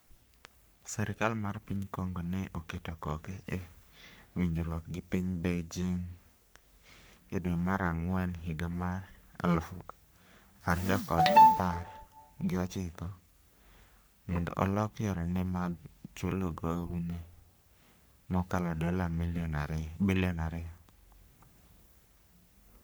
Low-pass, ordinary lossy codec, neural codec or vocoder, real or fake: none; none; codec, 44.1 kHz, 3.4 kbps, Pupu-Codec; fake